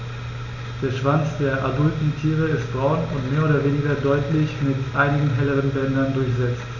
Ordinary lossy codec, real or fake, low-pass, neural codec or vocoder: none; real; 7.2 kHz; none